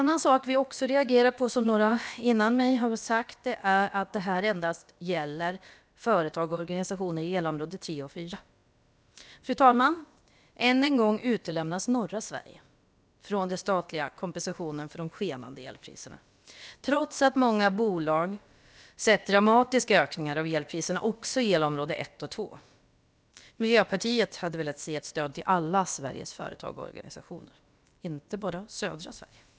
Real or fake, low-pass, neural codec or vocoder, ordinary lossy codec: fake; none; codec, 16 kHz, about 1 kbps, DyCAST, with the encoder's durations; none